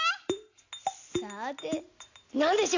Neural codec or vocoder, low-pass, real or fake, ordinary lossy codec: none; 7.2 kHz; real; AAC, 48 kbps